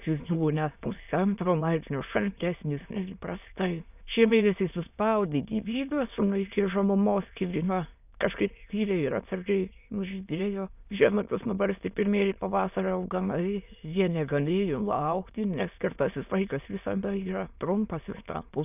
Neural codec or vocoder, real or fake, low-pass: autoencoder, 22.05 kHz, a latent of 192 numbers a frame, VITS, trained on many speakers; fake; 3.6 kHz